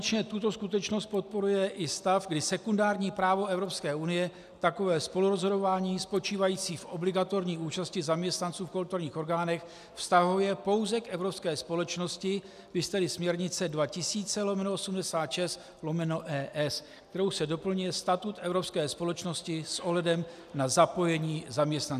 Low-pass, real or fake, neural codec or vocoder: 14.4 kHz; real; none